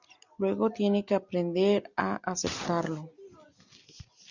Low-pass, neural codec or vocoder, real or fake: 7.2 kHz; none; real